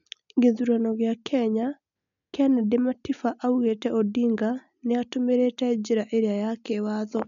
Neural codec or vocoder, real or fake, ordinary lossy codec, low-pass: none; real; none; 7.2 kHz